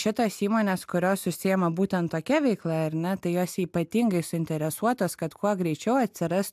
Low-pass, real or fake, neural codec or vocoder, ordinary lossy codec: 14.4 kHz; real; none; AAC, 96 kbps